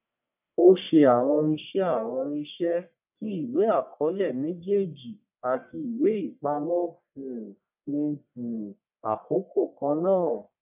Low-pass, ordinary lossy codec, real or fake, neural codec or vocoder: 3.6 kHz; none; fake; codec, 44.1 kHz, 1.7 kbps, Pupu-Codec